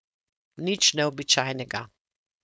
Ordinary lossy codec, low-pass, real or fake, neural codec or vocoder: none; none; fake; codec, 16 kHz, 4.8 kbps, FACodec